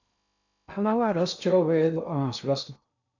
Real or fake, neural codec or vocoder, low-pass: fake; codec, 16 kHz in and 24 kHz out, 0.6 kbps, FocalCodec, streaming, 2048 codes; 7.2 kHz